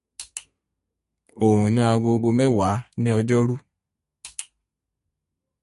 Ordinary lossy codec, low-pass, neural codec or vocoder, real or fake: MP3, 48 kbps; 14.4 kHz; codec, 32 kHz, 1.9 kbps, SNAC; fake